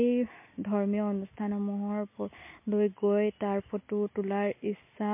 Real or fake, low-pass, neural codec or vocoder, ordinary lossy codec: real; 3.6 kHz; none; MP3, 24 kbps